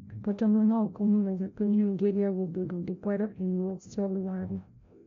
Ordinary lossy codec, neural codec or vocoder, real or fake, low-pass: MP3, 64 kbps; codec, 16 kHz, 0.5 kbps, FreqCodec, larger model; fake; 7.2 kHz